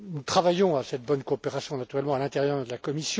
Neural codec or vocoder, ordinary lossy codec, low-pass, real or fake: none; none; none; real